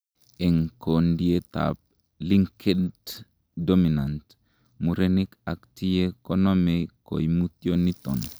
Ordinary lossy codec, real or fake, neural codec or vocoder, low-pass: none; real; none; none